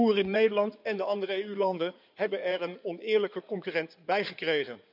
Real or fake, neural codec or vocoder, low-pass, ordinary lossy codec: fake; codec, 16 kHz in and 24 kHz out, 2.2 kbps, FireRedTTS-2 codec; 5.4 kHz; none